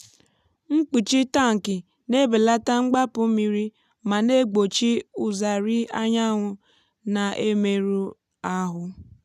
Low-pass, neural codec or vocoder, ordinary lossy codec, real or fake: 14.4 kHz; none; none; real